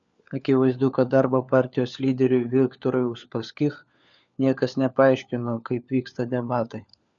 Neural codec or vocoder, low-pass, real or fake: codec, 16 kHz, 4 kbps, FunCodec, trained on LibriTTS, 50 frames a second; 7.2 kHz; fake